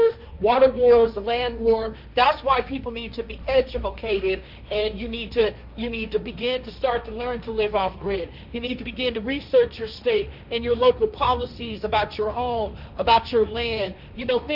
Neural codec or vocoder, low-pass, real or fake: codec, 16 kHz, 1.1 kbps, Voila-Tokenizer; 5.4 kHz; fake